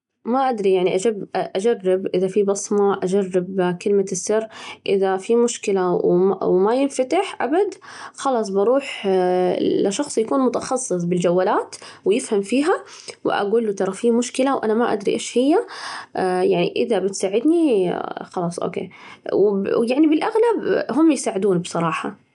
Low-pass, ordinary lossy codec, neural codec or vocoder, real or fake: 10.8 kHz; none; none; real